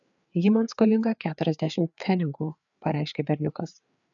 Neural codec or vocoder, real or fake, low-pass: codec, 16 kHz, 4 kbps, FreqCodec, larger model; fake; 7.2 kHz